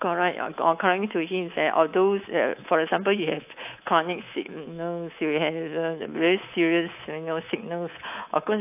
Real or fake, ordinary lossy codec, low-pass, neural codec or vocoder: fake; AAC, 32 kbps; 3.6 kHz; codec, 24 kHz, 3.1 kbps, DualCodec